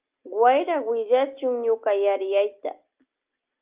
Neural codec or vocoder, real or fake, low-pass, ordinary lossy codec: none; real; 3.6 kHz; Opus, 24 kbps